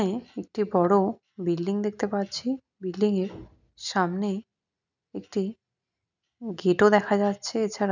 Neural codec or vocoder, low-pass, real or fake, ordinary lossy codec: none; 7.2 kHz; real; none